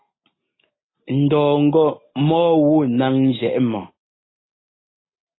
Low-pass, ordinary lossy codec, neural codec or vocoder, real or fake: 7.2 kHz; AAC, 16 kbps; none; real